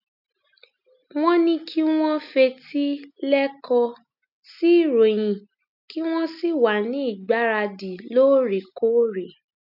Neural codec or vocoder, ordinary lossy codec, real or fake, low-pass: none; none; real; 5.4 kHz